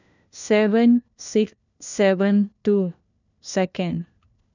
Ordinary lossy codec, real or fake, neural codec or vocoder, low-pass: none; fake; codec, 16 kHz, 1 kbps, FunCodec, trained on LibriTTS, 50 frames a second; 7.2 kHz